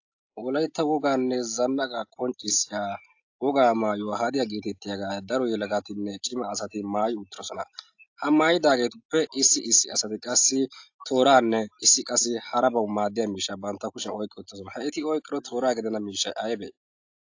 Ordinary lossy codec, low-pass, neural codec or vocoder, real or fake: AAC, 48 kbps; 7.2 kHz; none; real